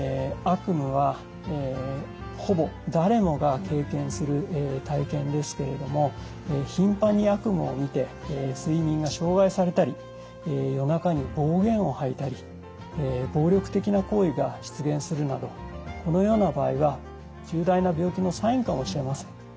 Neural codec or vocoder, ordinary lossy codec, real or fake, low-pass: none; none; real; none